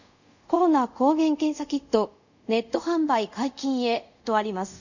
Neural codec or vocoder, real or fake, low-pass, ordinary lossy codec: codec, 24 kHz, 0.5 kbps, DualCodec; fake; 7.2 kHz; none